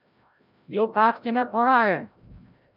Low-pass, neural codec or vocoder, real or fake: 5.4 kHz; codec, 16 kHz, 0.5 kbps, FreqCodec, larger model; fake